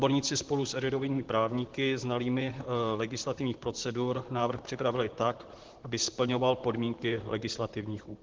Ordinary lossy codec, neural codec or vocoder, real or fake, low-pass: Opus, 16 kbps; vocoder, 44.1 kHz, 128 mel bands, Pupu-Vocoder; fake; 7.2 kHz